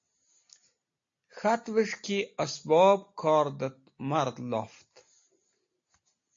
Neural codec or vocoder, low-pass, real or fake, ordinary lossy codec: none; 7.2 kHz; real; AAC, 48 kbps